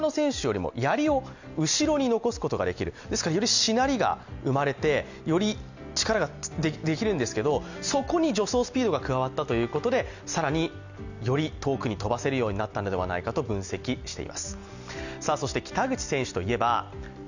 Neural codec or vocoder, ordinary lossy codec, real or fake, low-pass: none; none; real; 7.2 kHz